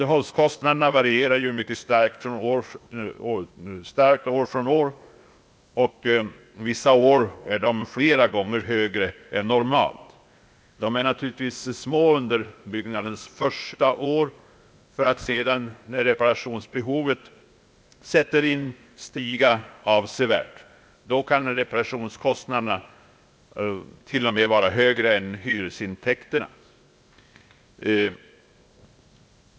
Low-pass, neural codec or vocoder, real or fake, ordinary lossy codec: none; codec, 16 kHz, 0.8 kbps, ZipCodec; fake; none